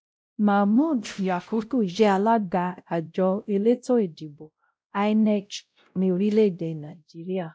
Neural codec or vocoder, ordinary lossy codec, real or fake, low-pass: codec, 16 kHz, 0.5 kbps, X-Codec, WavLM features, trained on Multilingual LibriSpeech; none; fake; none